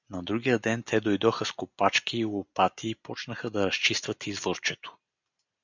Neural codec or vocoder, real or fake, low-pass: none; real; 7.2 kHz